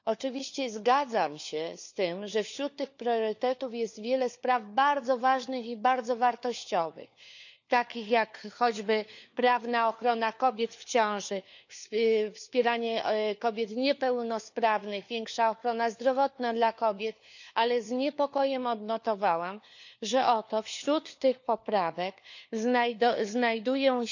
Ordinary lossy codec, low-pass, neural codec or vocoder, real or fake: none; 7.2 kHz; codec, 16 kHz, 4 kbps, FunCodec, trained on LibriTTS, 50 frames a second; fake